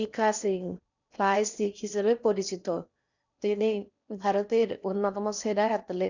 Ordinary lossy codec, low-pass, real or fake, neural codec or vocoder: none; 7.2 kHz; fake; codec, 16 kHz in and 24 kHz out, 0.8 kbps, FocalCodec, streaming, 65536 codes